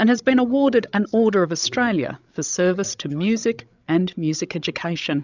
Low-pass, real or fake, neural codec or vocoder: 7.2 kHz; fake; codec, 16 kHz, 16 kbps, FreqCodec, larger model